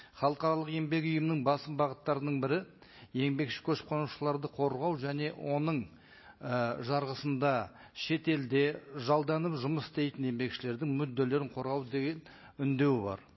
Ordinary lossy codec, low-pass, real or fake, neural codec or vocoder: MP3, 24 kbps; 7.2 kHz; real; none